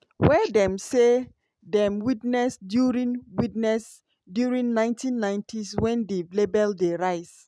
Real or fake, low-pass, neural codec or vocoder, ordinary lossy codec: real; none; none; none